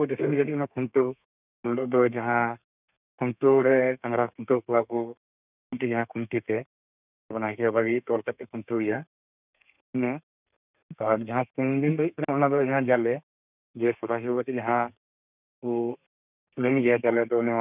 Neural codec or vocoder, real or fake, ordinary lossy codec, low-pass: codec, 32 kHz, 1.9 kbps, SNAC; fake; none; 3.6 kHz